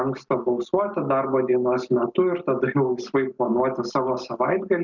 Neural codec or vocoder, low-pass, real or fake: none; 7.2 kHz; real